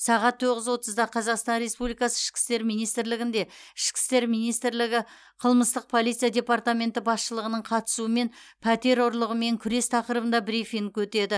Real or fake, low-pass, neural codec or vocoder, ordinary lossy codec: real; none; none; none